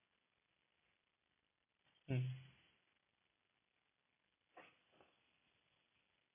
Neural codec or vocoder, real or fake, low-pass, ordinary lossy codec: none; real; 3.6 kHz; none